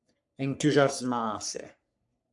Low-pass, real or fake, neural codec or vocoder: 10.8 kHz; fake; codec, 44.1 kHz, 3.4 kbps, Pupu-Codec